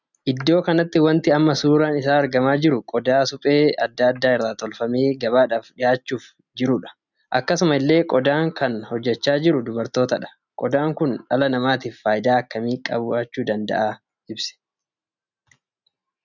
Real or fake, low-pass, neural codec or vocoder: real; 7.2 kHz; none